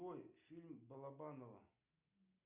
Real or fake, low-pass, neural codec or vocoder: real; 3.6 kHz; none